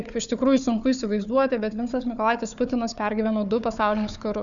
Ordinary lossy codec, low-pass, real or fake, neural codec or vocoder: MP3, 96 kbps; 7.2 kHz; fake; codec, 16 kHz, 4 kbps, FunCodec, trained on Chinese and English, 50 frames a second